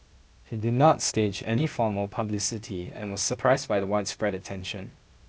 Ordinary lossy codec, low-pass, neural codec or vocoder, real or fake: none; none; codec, 16 kHz, 0.8 kbps, ZipCodec; fake